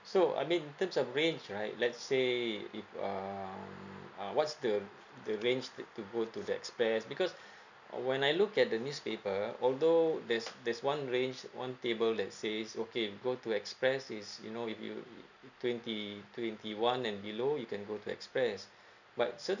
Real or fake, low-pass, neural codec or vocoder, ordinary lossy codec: real; 7.2 kHz; none; none